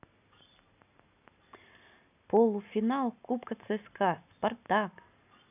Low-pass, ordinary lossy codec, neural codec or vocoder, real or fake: 3.6 kHz; none; none; real